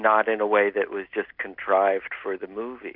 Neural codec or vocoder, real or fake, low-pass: none; real; 5.4 kHz